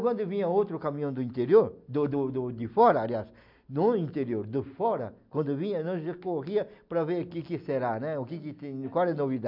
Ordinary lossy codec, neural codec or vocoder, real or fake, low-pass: MP3, 48 kbps; none; real; 5.4 kHz